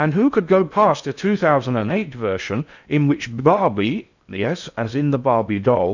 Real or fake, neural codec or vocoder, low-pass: fake; codec, 16 kHz in and 24 kHz out, 0.6 kbps, FocalCodec, streaming, 2048 codes; 7.2 kHz